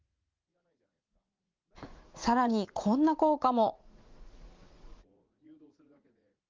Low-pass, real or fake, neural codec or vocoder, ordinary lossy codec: 7.2 kHz; fake; vocoder, 44.1 kHz, 80 mel bands, Vocos; Opus, 24 kbps